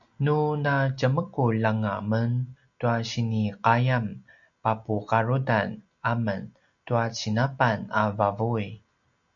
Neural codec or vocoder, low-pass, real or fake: none; 7.2 kHz; real